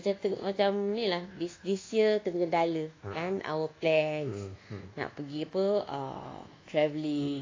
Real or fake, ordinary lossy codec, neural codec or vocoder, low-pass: fake; AAC, 32 kbps; codec, 24 kHz, 1.2 kbps, DualCodec; 7.2 kHz